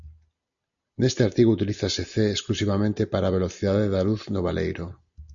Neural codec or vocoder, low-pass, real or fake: none; 7.2 kHz; real